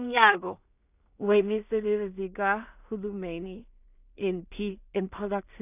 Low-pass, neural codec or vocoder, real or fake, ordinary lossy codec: 3.6 kHz; codec, 16 kHz in and 24 kHz out, 0.4 kbps, LongCat-Audio-Codec, two codebook decoder; fake; none